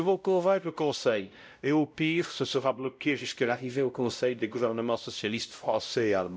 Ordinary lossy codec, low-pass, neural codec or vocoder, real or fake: none; none; codec, 16 kHz, 0.5 kbps, X-Codec, WavLM features, trained on Multilingual LibriSpeech; fake